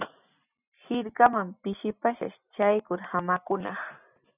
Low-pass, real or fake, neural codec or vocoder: 3.6 kHz; real; none